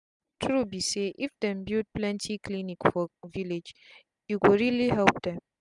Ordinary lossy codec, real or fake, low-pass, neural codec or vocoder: none; real; 10.8 kHz; none